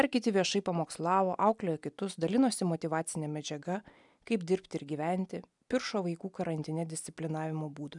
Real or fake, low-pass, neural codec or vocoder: real; 10.8 kHz; none